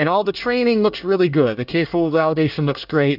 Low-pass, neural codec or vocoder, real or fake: 5.4 kHz; codec, 24 kHz, 1 kbps, SNAC; fake